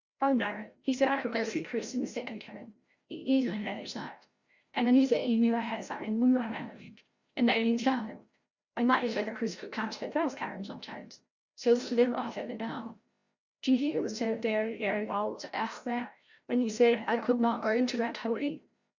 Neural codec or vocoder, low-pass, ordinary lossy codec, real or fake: codec, 16 kHz, 0.5 kbps, FreqCodec, larger model; 7.2 kHz; Opus, 64 kbps; fake